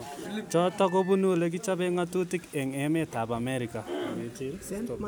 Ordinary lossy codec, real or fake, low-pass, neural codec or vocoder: none; real; none; none